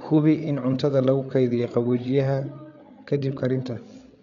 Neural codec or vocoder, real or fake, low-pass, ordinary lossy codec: codec, 16 kHz, 8 kbps, FreqCodec, larger model; fake; 7.2 kHz; none